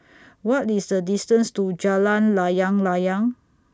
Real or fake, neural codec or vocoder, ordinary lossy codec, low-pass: real; none; none; none